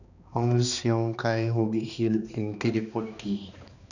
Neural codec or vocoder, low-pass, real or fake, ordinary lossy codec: codec, 16 kHz, 2 kbps, X-Codec, HuBERT features, trained on balanced general audio; 7.2 kHz; fake; none